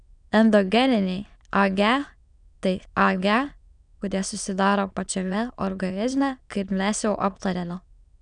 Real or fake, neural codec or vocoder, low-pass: fake; autoencoder, 22.05 kHz, a latent of 192 numbers a frame, VITS, trained on many speakers; 9.9 kHz